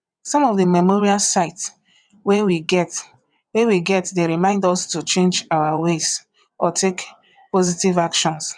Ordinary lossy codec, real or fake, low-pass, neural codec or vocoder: none; fake; 9.9 kHz; vocoder, 22.05 kHz, 80 mel bands, WaveNeXt